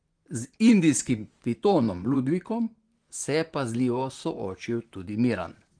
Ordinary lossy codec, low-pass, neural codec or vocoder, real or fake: Opus, 24 kbps; 9.9 kHz; vocoder, 44.1 kHz, 128 mel bands, Pupu-Vocoder; fake